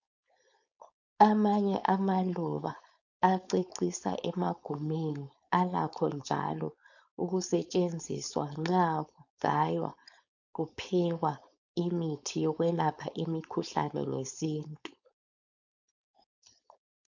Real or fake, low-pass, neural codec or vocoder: fake; 7.2 kHz; codec, 16 kHz, 4.8 kbps, FACodec